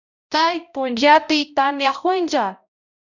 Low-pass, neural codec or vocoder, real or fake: 7.2 kHz; codec, 16 kHz, 0.5 kbps, X-Codec, HuBERT features, trained on balanced general audio; fake